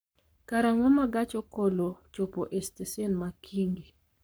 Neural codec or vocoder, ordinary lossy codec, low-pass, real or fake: codec, 44.1 kHz, 7.8 kbps, Pupu-Codec; none; none; fake